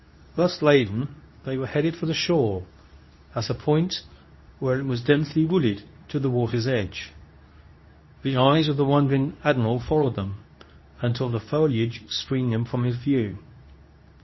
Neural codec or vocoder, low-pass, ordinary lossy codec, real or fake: codec, 24 kHz, 0.9 kbps, WavTokenizer, medium speech release version 2; 7.2 kHz; MP3, 24 kbps; fake